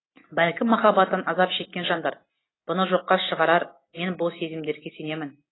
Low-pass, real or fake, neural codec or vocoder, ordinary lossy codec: 7.2 kHz; real; none; AAC, 16 kbps